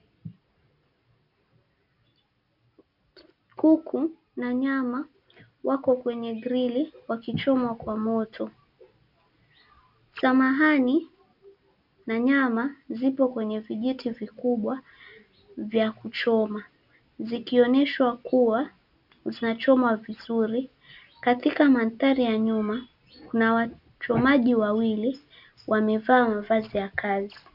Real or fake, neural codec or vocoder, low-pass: real; none; 5.4 kHz